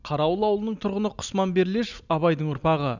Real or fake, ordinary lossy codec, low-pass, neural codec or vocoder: real; none; 7.2 kHz; none